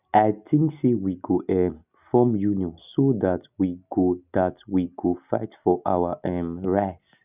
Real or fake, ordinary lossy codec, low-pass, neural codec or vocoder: real; none; 3.6 kHz; none